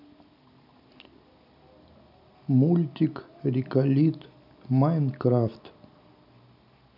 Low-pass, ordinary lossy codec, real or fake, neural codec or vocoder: 5.4 kHz; none; real; none